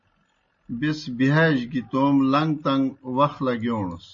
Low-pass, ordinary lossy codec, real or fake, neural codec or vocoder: 7.2 kHz; MP3, 32 kbps; real; none